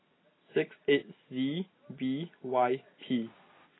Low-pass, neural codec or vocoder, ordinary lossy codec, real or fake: 7.2 kHz; none; AAC, 16 kbps; real